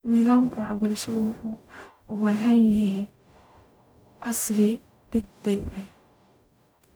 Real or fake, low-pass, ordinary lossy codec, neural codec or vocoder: fake; none; none; codec, 44.1 kHz, 0.9 kbps, DAC